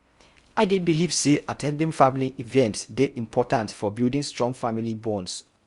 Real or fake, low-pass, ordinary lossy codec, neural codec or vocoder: fake; 10.8 kHz; Opus, 64 kbps; codec, 16 kHz in and 24 kHz out, 0.6 kbps, FocalCodec, streaming, 4096 codes